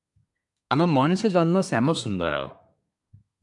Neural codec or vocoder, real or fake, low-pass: codec, 24 kHz, 1 kbps, SNAC; fake; 10.8 kHz